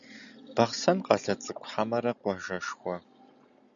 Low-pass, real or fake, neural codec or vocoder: 7.2 kHz; real; none